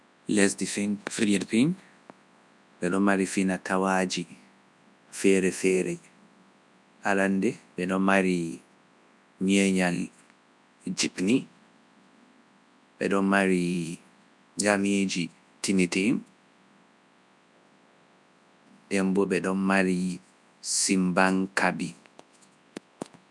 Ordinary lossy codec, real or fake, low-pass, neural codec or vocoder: none; fake; none; codec, 24 kHz, 0.9 kbps, WavTokenizer, large speech release